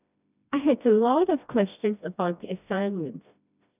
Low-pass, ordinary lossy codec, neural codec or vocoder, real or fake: 3.6 kHz; none; codec, 16 kHz, 1 kbps, FreqCodec, smaller model; fake